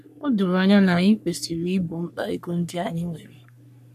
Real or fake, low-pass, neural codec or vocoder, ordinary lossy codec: fake; 14.4 kHz; codec, 44.1 kHz, 3.4 kbps, Pupu-Codec; none